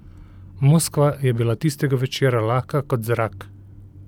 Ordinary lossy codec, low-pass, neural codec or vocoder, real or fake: none; 19.8 kHz; none; real